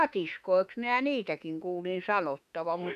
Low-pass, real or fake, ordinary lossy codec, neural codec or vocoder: 14.4 kHz; fake; none; autoencoder, 48 kHz, 32 numbers a frame, DAC-VAE, trained on Japanese speech